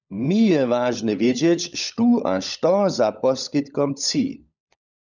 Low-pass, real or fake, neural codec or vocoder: 7.2 kHz; fake; codec, 16 kHz, 16 kbps, FunCodec, trained on LibriTTS, 50 frames a second